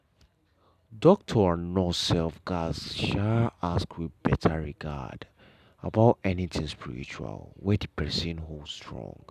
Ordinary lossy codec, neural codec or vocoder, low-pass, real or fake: none; none; 14.4 kHz; real